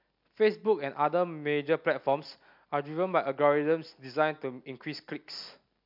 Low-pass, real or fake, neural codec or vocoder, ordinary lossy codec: 5.4 kHz; real; none; MP3, 48 kbps